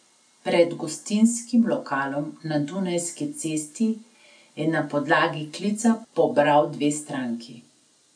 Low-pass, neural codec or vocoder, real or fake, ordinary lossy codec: 9.9 kHz; none; real; none